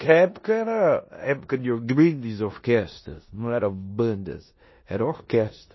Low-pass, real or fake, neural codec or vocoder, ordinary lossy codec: 7.2 kHz; fake; codec, 16 kHz in and 24 kHz out, 0.9 kbps, LongCat-Audio-Codec, four codebook decoder; MP3, 24 kbps